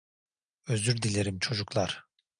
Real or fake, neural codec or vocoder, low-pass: real; none; 9.9 kHz